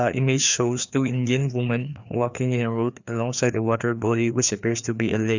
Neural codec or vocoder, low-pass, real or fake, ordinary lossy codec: codec, 16 kHz, 2 kbps, FreqCodec, larger model; 7.2 kHz; fake; none